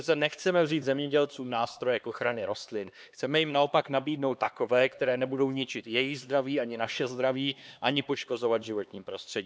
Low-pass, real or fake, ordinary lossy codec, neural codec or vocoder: none; fake; none; codec, 16 kHz, 2 kbps, X-Codec, HuBERT features, trained on LibriSpeech